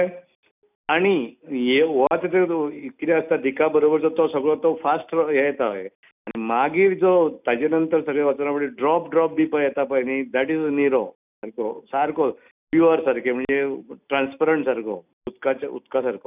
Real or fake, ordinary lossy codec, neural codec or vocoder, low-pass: real; none; none; 3.6 kHz